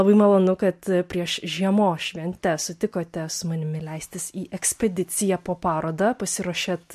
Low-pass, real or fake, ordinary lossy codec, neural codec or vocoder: 14.4 kHz; real; MP3, 64 kbps; none